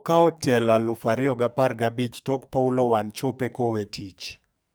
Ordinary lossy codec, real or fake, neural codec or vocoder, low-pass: none; fake; codec, 44.1 kHz, 2.6 kbps, SNAC; none